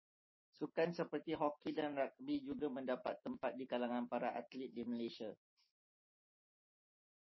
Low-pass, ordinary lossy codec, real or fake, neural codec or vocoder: 7.2 kHz; MP3, 24 kbps; real; none